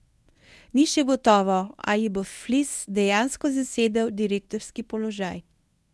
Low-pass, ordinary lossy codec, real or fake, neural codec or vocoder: none; none; fake; codec, 24 kHz, 0.9 kbps, WavTokenizer, medium speech release version 1